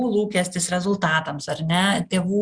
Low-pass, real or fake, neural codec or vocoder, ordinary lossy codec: 9.9 kHz; real; none; Opus, 64 kbps